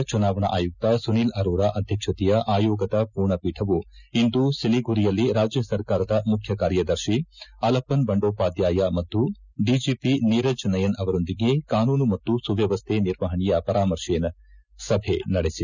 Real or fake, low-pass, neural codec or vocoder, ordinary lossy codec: real; 7.2 kHz; none; none